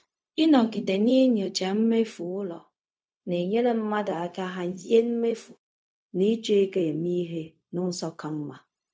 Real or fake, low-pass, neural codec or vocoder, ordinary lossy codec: fake; none; codec, 16 kHz, 0.4 kbps, LongCat-Audio-Codec; none